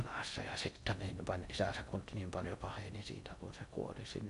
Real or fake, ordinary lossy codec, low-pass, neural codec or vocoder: fake; none; 10.8 kHz; codec, 16 kHz in and 24 kHz out, 0.6 kbps, FocalCodec, streaming, 4096 codes